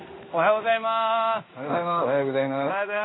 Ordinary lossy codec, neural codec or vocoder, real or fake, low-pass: AAC, 16 kbps; codec, 16 kHz in and 24 kHz out, 1 kbps, XY-Tokenizer; fake; 7.2 kHz